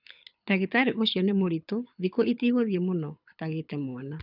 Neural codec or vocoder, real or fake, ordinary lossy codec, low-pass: codec, 24 kHz, 6 kbps, HILCodec; fake; none; 5.4 kHz